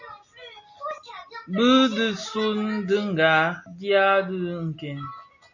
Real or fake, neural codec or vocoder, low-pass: real; none; 7.2 kHz